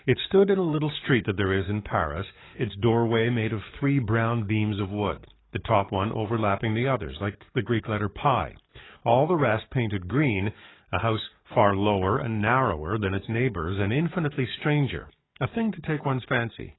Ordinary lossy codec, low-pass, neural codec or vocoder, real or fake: AAC, 16 kbps; 7.2 kHz; codec, 44.1 kHz, 7.8 kbps, DAC; fake